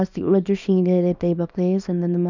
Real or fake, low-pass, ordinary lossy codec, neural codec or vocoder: fake; 7.2 kHz; none; codec, 24 kHz, 0.9 kbps, WavTokenizer, small release